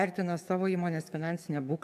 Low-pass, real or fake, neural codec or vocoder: 14.4 kHz; fake; codec, 44.1 kHz, 7.8 kbps, DAC